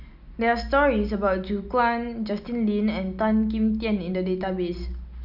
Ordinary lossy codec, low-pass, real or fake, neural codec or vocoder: none; 5.4 kHz; real; none